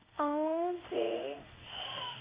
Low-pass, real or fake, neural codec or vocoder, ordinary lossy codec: 3.6 kHz; fake; codec, 16 kHz in and 24 kHz out, 1 kbps, XY-Tokenizer; Opus, 64 kbps